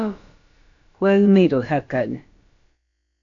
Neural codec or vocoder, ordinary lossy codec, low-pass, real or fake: codec, 16 kHz, about 1 kbps, DyCAST, with the encoder's durations; AAC, 64 kbps; 7.2 kHz; fake